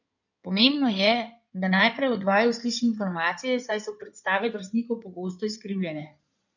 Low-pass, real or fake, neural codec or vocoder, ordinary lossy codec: 7.2 kHz; fake; codec, 16 kHz in and 24 kHz out, 2.2 kbps, FireRedTTS-2 codec; none